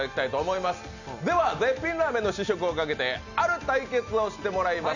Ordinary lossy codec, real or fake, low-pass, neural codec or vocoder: none; real; 7.2 kHz; none